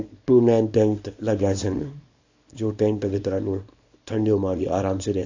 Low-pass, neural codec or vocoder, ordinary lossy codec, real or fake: 7.2 kHz; codec, 24 kHz, 0.9 kbps, WavTokenizer, small release; AAC, 48 kbps; fake